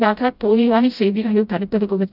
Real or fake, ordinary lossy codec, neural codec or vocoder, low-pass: fake; none; codec, 16 kHz, 0.5 kbps, FreqCodec, smaller model; 5.4 kHz